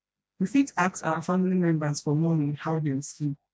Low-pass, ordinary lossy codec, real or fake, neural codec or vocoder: none; none; fake; codec, 16 kHz, 1 kbps, FreqCodec, smaller model